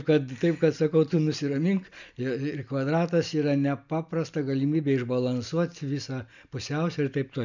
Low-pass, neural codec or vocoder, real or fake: 7.2 kHz; none; real